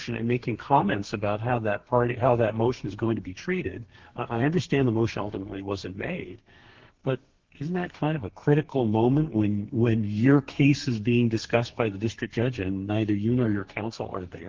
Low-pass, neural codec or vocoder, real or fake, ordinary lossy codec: 7.2 kHz; codec, 32 kHz, 1.9 kbps, SNAC; fake; Opus, 16 kbps